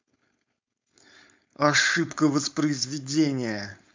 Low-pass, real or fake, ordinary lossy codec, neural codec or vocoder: 7.2 kHz; fake; MP3, 64 kbps; codec, 16 kHz, 4.8 kbps, FACodec